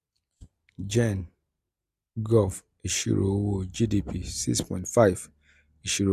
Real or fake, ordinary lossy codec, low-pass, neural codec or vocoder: real; MP3, 96 kbps; 14.4 kHz; none